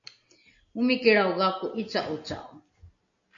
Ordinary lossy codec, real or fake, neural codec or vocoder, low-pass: MP3, 48 kbps; real; none; 7.2 kHz